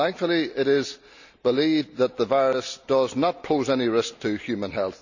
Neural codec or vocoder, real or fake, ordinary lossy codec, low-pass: none; real; none; 7.2 kHz